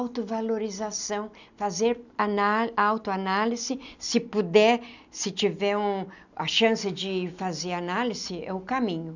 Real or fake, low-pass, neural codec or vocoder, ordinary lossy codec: real; 7.2 kHz; none; none